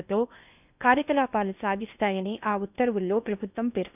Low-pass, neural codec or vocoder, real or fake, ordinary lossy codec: 3.6 kHz; codec, 16 kHz in and 24 kHz out, 0.8 kbps, FocalCodec, streaming, 65536 codes; fake; none